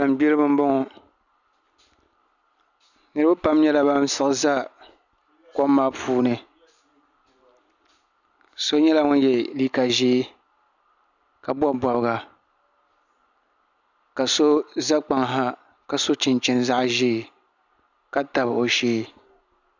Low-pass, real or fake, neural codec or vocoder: 7.2 kHz; real; none